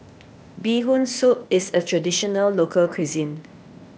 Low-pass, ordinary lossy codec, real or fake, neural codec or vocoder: none; none; fake; codec, 16 kHz, 0.8 kbps, ZipCodec